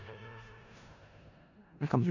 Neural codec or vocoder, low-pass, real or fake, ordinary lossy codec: codec, 16 kHz in and 24 kHz out, 0.4 kbps, LongCat-Audio-Codec, four codebook decoder; 7.2 kHz; fake; none